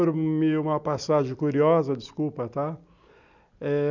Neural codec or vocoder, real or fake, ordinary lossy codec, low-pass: none; real; none; 7.2 kHz